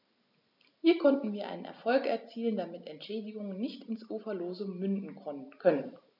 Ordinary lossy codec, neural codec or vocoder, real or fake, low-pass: none; none; real; 5.4 kHz